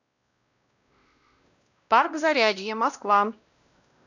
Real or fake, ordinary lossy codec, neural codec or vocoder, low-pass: fake; none; codec, 16 kHz, 1 kbps, X-Codec, WavLM features, trained on Multilingual LibriSpeech; 7.2 kHz